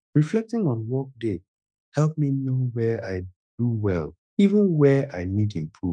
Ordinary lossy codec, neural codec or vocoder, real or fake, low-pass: none; autoencoder, 48 kHz, 32 numbers a frame, DAC-VAE, trained on Japanese speech; fake; 9.9 kHz